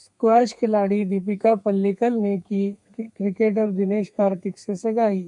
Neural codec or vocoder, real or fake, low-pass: codec, 32 kHz, 1.9 kbps, SNAC; fake; 10.8 kHz